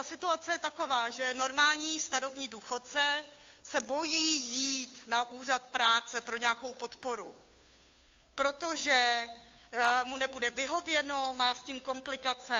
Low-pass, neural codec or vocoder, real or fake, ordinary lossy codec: 7.2 kHz; codec, 16 kHz, 2 kbps, FunCodec, trained on Chinese and English, 25 frames a second; fake; AAC, 48 kbps